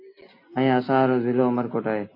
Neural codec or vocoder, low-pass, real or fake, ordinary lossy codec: none; 5.4 kHz; real; AAC, 32 kbps